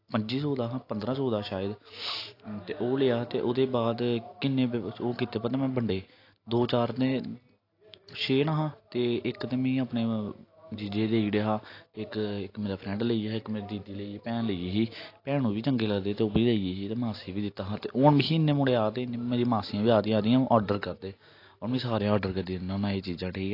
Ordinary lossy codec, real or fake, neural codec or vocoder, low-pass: AAC, 32 kbps; real; none; 5.4 kHz